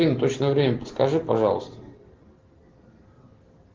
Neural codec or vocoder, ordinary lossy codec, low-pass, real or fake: none; Opus, 16 kbps; 7.2 kHz; real